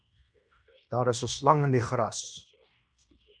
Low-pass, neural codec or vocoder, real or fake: 9.9 kHz; codec, 16 kHz in and 24 kHz out, 0.9 kbps, LongCat-Audio-Codec, fine tuned four codebook decoder; fake